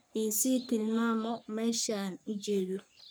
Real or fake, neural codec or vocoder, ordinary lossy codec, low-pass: fake; codec, 44.1 kHz, 3.4 kbps, Pupu-Codec; none; none